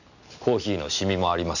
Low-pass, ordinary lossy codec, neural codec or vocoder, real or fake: 7.2 kHz; none; none; real